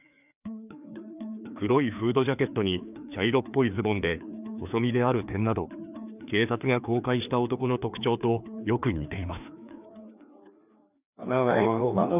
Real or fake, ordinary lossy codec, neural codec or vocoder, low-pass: fake; none; codec, 16 kHz, 2 kbps, FreqCodec, larger model; 3.6 kHz